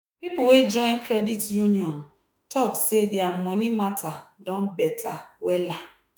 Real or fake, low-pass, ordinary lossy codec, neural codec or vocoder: fake; none; none; autoencoder, 48 kHz, 32 numbers a frame, DAC-VAE, trained on Japanese speech